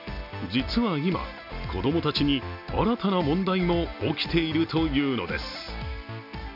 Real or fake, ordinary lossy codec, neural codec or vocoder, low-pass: real; none; none; 5.4 kHz